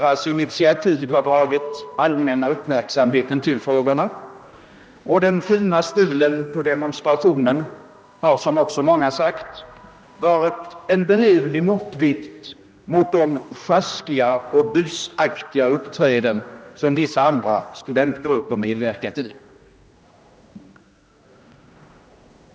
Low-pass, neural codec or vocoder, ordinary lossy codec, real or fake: none; codec, 16 kHz, 1 kbps, X-Codec, HuBERT features, trained on general audio; none; fake